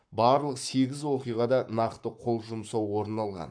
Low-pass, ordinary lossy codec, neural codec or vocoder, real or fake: 9.9 kHz; AAC, 64 kbps; codec, 44.1 kHz, 7.8 kbps, Pupu-Codec; fake